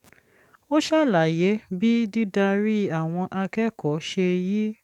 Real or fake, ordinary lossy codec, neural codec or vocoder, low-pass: fake; none; codec, 44.1 kHz, 7.8 kbps, DAC; 19.8 kHz